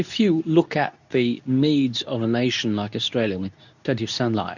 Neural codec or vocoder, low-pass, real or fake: codec, 24 kHz, 0.9 kbps, WavTokenizer, medium speech release version 2; 7.2 kHz; fake